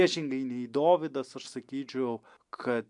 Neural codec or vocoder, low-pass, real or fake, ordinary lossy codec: none; 10.8 kHz; real; MP3, 96 kbps